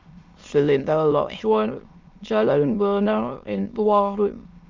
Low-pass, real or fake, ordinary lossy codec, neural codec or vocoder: 7.2 kHz; fake; Opus, 32 kbps; autoencoder, 22.05 kHz, a latent of 192 numbers a frame, VITS, trained on many speakers